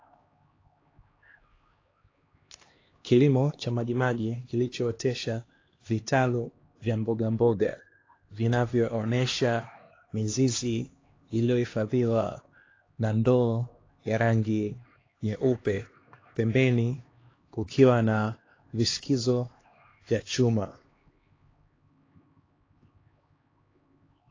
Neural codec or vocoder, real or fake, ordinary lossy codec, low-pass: codec, 16 kHz, 2 kbps, X-Codec, HuBERT features, trained on LibriSpeech; fake; AAC, 32 kbps; 7.2 kHz